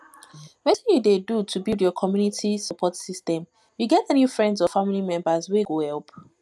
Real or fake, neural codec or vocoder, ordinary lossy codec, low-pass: real; none; none; none